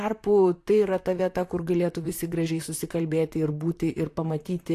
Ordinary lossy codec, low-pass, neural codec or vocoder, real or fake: AAC, 64 kbps; 14.4 kHz; vocoder, 44.1 kHz, 128 mel bands, Pupu-Vocoder; fake